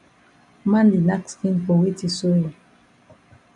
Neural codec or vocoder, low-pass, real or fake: none; 10.8 kHz; real